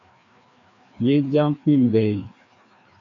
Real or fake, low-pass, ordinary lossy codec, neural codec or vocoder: fake; 7.2 kHz; AAC, 48 kbps; codec, 16 kHz, 2 kbps, FreqCodec, larger model